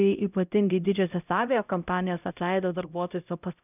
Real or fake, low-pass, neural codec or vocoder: fake; 3.6 kHz; codec, 16 kHz, 0.5 kbps, X-Codec, HuBERT features, trained on LibriSpeech